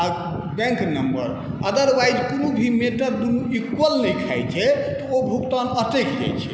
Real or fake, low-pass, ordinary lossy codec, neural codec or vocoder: real; none; none; none